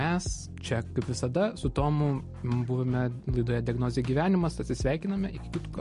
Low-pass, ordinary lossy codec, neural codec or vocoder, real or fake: 14.4 kHz; MP3, 48 kbps; none; real